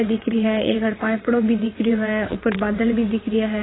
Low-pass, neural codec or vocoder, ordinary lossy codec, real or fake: 7.2 kHz; vocoder, 22.05 kHz, 80 mel bands, WaveNeXt; AAC, 16 kbps; fake